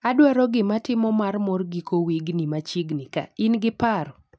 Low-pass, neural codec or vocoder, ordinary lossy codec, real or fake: none; none; none; real